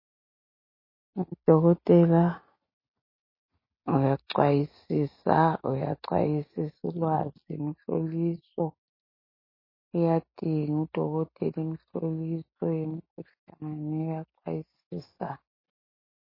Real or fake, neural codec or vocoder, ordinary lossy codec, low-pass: real; none; MP3, 24 kbps; 5.4 kHz